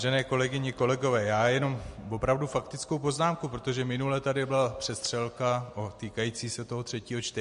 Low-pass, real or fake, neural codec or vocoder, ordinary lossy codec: 14.4 kHz; real; none; MP3, 48 kbps